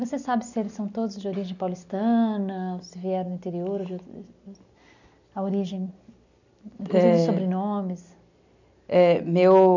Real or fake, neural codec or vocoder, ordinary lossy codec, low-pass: real; none; none; 7.2 kHz